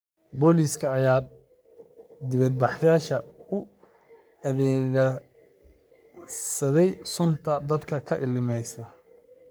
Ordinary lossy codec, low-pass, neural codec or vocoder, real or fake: none; none; codec, 44.1 kHz, 3.4 kbps, Pupu-Codec; fake